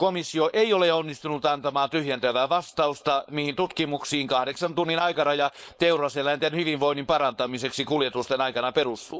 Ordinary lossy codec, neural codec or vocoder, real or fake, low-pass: none; codec, 16 kHz, 4.8 kbps, FACodec; fake; none